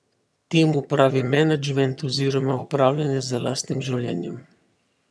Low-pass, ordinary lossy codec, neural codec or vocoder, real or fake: none; none; vocoder, 22.05 kHz, 80 mel bands, HiFi-GAN; fake